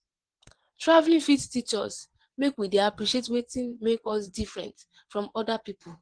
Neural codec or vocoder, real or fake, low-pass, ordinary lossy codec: vocoder, 22.05 kHz, 80 mel bands, WaveNeXt; fake; 9.9 kHz; Opus, 16 kbps